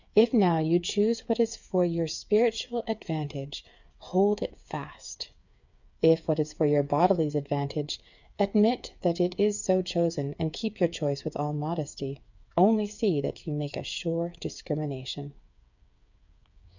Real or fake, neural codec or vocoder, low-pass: fake; codec, 16 kHz, 8 kbps, FreqCodec, smaller model; 7.2 kHz